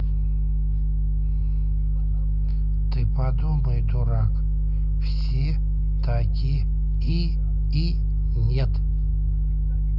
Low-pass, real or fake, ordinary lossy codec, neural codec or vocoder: 5.4 kHz; real; none; none